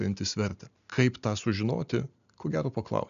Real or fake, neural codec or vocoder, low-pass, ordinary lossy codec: real; none; 7.2 kHz; MP3, 96 kbps